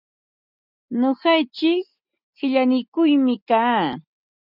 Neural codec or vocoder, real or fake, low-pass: none; real; 5.4 kHz